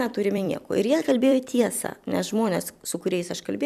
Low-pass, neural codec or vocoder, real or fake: 14.4 kHz; vocoder, 44.1 kHz, 128 mel bands every 256 samples, BigVGAN v2; fake